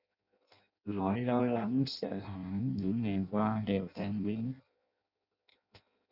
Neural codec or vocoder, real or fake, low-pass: codec, 16 kHz in and 24 kHz out, 0.6 kbps, FireRedTTS-2 codec; fake; 5.4 kHz